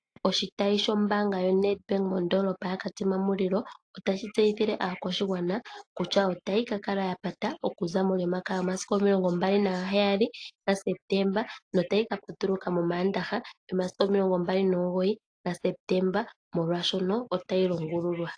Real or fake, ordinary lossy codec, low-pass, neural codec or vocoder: real; AAC, 48 kbps; 9.9 kHz; none